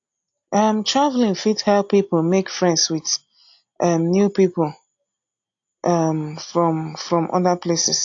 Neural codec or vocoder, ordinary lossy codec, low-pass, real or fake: none; AAC, 48 kbps; 7.2 kHz; real